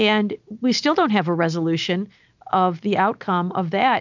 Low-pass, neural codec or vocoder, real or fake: 7.2 kHz; none; real